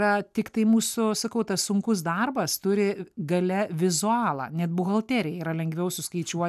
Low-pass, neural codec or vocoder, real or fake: 14.4 kHz; none; real